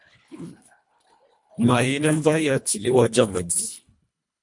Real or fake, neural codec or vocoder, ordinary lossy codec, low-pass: fake; codec, 24 kHz, 1.5 kbps, HILCodec; MP3, 64 kbps; 10.8 kHz